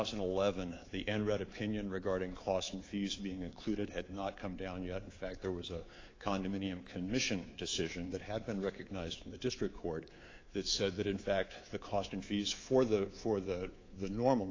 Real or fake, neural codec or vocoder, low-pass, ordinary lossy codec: fake; codec, 24 kHz, 3.1 kbps, DualCodec; 7.2 kHz; AAC, 32 kbps